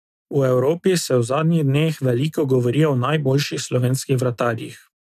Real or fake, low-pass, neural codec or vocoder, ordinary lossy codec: real; 14.4 kHz; none; none